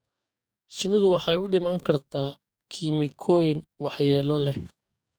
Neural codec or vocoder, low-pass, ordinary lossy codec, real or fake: codec, 44.1 kHz, 2.6 kbps, DAC; none; none; fake